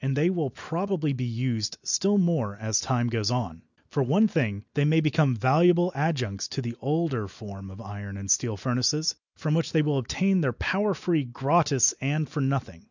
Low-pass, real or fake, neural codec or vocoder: 7.2 kHz; real; none